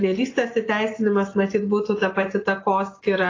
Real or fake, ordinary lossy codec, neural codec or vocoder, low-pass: real; AAC, 32 kbps; none; 7.2 kHz